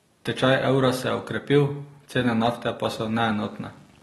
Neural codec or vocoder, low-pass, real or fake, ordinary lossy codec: none; 19.8 kHz; real; AAC, 32 kbps